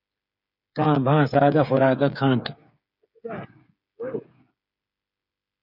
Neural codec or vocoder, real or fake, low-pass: codec, 16 kHz, 8 kbps, FreqCodec, smaller model; fake; 5.4 kHz